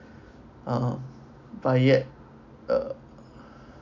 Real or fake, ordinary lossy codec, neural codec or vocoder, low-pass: real; none; none; 7.2 kHz